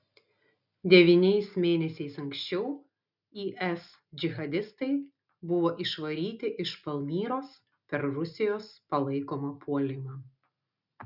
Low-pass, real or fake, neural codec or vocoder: 5.4 kHz; real; none